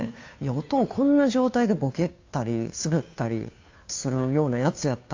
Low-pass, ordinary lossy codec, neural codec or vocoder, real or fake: 7.2 kHz; AAC, 48 kbps; codec, 16 kHz, 2 kbps, FunCodec, trained on Chinese and English, 25 frames a second; fake